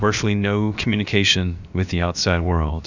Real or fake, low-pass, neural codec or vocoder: fake; 7.2 kHz; codec, 16 kHz, about 1 kbps, DyCAST, with the encoder's durations